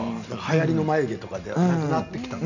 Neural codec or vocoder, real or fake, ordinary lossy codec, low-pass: none; real; none; 7.2 kHz